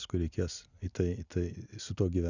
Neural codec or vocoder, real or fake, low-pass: none; real; 7.2 kHz